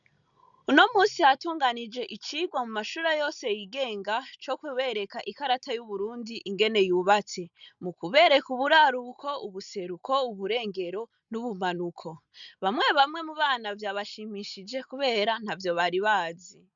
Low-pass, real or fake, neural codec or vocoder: 7.2 kHz; real; none